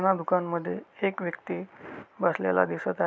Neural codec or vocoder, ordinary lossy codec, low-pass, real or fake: none; none; none; real